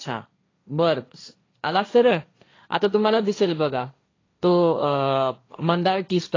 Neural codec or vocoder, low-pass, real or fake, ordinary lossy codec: codec, 16 kHz, 1.1 kbps, Voila-Tokenizer; 7.2 kHz; fake; AAC, 32 kbps